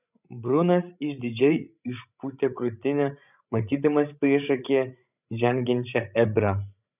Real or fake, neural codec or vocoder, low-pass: fake; codec, 16 kHz, 16 kbps, FreqCodec, larger model; 3.6 kHz